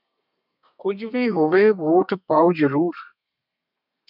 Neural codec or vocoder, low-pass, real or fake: codec, 32 kHz, 1.9 kbps, SNAC; 5.4 kHz; fake